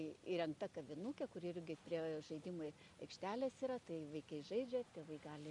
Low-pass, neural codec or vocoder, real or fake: 10.8 kHz; none; real